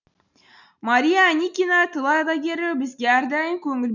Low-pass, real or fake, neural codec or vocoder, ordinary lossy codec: 7.2 kHz; real; none; none